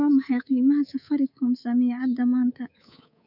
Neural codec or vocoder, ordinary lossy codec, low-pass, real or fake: codec, 24 kHz, 3.1 kbps, DualCodec; none; 5.4 kHz; fake